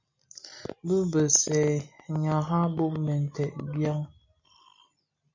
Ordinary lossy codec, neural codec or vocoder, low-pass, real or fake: MP3, 64 kbps; none; 7.2 kHz; real